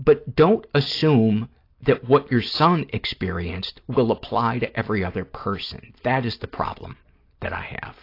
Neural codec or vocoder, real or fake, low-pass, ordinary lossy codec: none; real; 5.4 kHz; AAC, 32 kbps